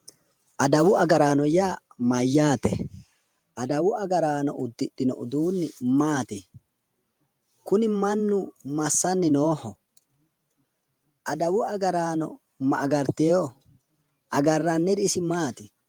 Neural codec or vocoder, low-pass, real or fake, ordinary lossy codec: vocoder, 48 kHz, 128 mel bands, Vocos; 19.8 kHz; fake; Opus, 32 kbps